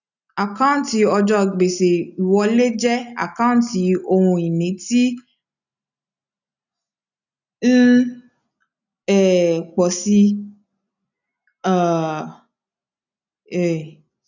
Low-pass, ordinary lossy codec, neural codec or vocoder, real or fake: 7.2 kHz; none; none; real